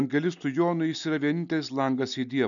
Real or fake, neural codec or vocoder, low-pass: real; none; 7.2 kHz